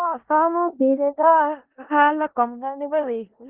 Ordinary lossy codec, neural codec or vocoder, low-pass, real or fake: Opus, 16 kbps; codec, 16 kHz in and 24 kHz out, 0.4 kbps, LongCat-Audio-Codec, four codebook decoder; 3.6 kHz; fake